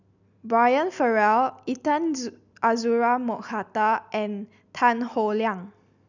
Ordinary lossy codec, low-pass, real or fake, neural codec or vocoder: none; 7.2 kHz; real; none